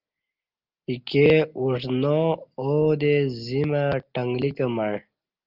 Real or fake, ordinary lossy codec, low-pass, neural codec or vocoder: real; Opus, 24 kbps; 5.4 kHz; none